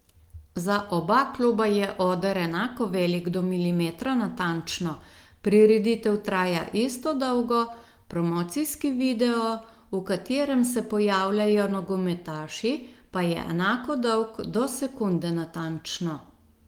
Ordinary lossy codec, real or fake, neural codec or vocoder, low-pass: Opus, 24 kbps; real; none; 19.8 kHz